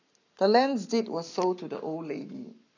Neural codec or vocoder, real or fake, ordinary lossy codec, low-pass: codec, 44.1 kHz, 7.8 kbps, Pupu-Codec; fake; none; 7.2 kHz